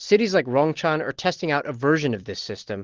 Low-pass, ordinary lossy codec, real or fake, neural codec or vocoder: 7.2 kHz; Opus, 16 kbps; real; none